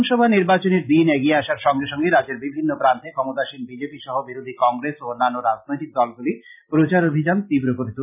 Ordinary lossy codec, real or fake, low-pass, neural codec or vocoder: none; real; 3.6 kHz; none